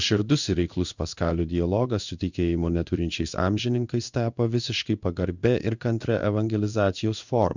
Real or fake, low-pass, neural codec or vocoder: fake; 7.2 kHz; codec, 16 kHz in and 24 kHz out, 1 kbps, XY-Tokenizer